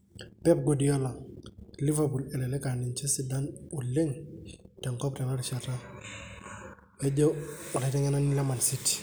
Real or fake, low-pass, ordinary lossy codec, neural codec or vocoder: real; none; none; none